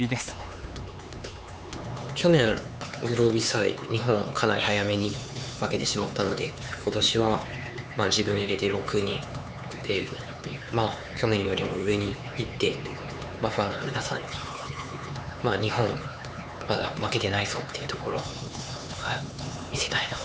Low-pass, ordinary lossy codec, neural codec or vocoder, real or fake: none; none; codec, 16 kHz, 4 kbps, X-Codec, HuBERT features, trained on LibriSpeech; fake